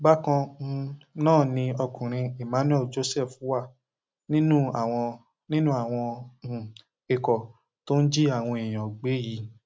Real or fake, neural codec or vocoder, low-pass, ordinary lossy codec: real; none; none; none